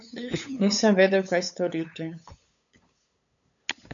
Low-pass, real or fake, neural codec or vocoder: 7.2 kHz; fake; codec, 16 kHz, 8 kbps, FunCodec, trained on LibriTTS, 25 frames a second